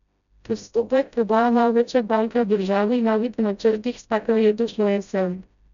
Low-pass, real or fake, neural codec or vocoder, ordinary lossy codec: 7.2 kHz; fake; codec, 16 kHz, 0.5 kbps, FreqCodec, smaller model; none